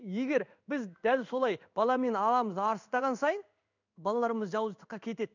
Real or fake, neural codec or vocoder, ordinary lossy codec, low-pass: fake; codec, 16 kHz in and 24 kHz out, 1 kbps, XY-Tokenizer; none; 7.2 kHz